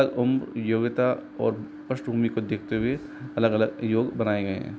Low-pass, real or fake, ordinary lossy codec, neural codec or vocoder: none; real; none; none